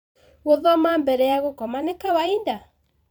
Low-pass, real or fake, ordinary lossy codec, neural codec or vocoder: 19.8 kHz; fake; none; vocoder, 44.1 kHz, 128 mel bands every 256 samples, BigVGAN v2